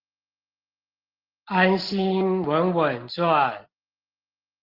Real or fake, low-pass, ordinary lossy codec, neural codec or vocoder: real; 5.4 kHz; Opus, 16 kbps; none